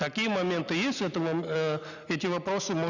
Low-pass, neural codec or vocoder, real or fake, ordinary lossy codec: 7.2 kHz; none; real; none